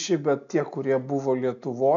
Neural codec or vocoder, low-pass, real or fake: none; 7.2 kHz; real